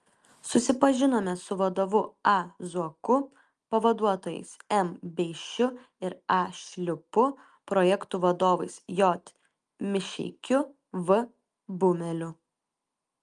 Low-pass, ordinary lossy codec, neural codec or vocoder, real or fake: 10.8 kHz; Opus, 32 kbps; none; real